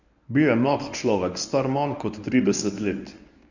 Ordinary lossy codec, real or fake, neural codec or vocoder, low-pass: none; fake; codec, 24 kHz, 0.9 kbps, WavTokenizer, medium speech release version 1; 7.2 kHz